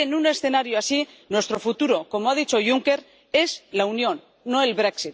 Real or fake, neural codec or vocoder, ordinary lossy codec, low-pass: real; none; none; none